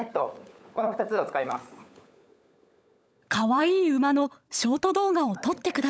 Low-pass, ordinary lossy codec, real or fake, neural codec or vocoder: none; none; fake; codec, 16 kHz, 16 kbps, FunCodec, trained on LibriTTS, 50 frames a second